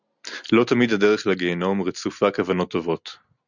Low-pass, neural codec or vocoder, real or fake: 7.2 kHz; none; real